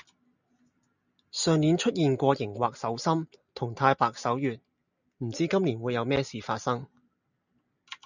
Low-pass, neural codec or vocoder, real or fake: 7.2 kHz; none; real